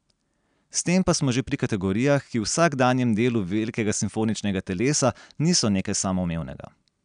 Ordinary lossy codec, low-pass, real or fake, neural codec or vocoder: none; 9.9 kHz; real; none